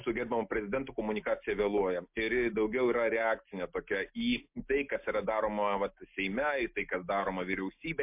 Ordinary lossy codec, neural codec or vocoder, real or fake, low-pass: MP3, 32 kbps; none; real; 3.6 kHz